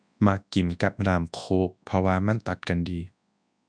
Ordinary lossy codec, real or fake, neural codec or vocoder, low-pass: none; fake; codec, 24 kHz, 0.9 kbps, WavTokenizer, large speech release; 9.9 kHz